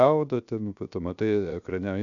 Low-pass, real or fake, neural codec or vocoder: 7.2 kHz; fake; codec, 16 kHz, 0.7 kbps, FocalCodec